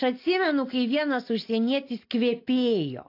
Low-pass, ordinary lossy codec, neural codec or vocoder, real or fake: 5.4 kHz; AAC, 32 kbps; none; real